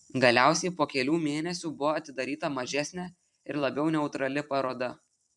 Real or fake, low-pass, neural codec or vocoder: fake; 10.8 kHz; vocoder, 44.1 kHz, 128 mel bands every 256 samples, BigVGAN v2